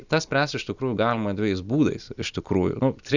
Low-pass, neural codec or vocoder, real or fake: 7.2 kHz; none; real